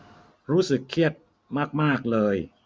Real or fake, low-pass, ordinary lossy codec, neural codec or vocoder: real; none; none; none